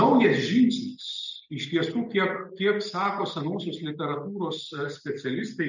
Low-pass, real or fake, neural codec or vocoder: 7.2 kHz; real; none